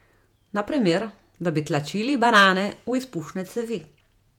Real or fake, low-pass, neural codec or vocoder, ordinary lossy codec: fake; 19.8 kHz; vocoder, 48 kHz, 128 mel bands, Vocos; MP3, 96 kbps